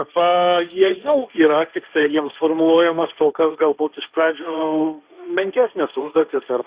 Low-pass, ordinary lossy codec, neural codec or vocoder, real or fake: 3.6 kHz; Opus, 64 kbps; codec, 16 kHz, 1.1 kbps, Voila-Tokenizer; fake